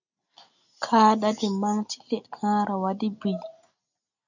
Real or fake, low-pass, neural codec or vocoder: real; 7.2 kHz; none